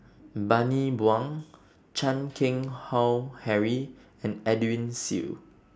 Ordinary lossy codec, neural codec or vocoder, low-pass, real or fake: none; none; none; real